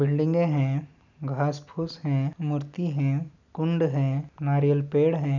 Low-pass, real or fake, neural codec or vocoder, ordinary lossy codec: 7.2 kHz; real; none; none